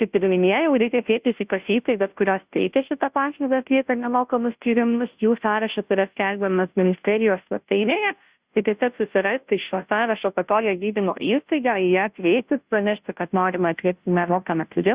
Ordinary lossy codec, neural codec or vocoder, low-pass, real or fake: Opus, 64 kbps; codec, 16 kHz, 0.5 kbps, FunCodec, trained on Chinese and English, 25 frames a second; 3.6 kHz; fake